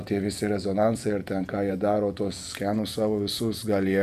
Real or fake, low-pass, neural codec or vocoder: fake; 14.4 kHz; vocoder, 48 kHz, 128 mel bands, Vocos